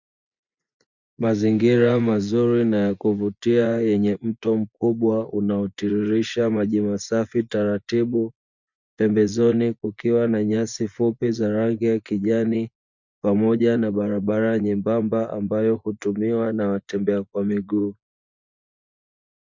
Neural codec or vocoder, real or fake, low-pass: vocoder, 24 kHz, 100 mel bands, Vocos; fake; 7.2 kHz